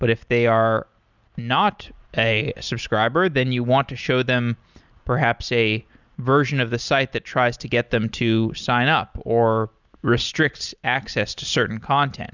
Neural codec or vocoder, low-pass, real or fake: none; 7.2 kHz; real